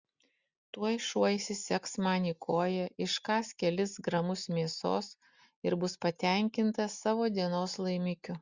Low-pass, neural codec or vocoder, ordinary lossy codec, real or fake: 7.2 kHz; none; Opus, 64 kbps; real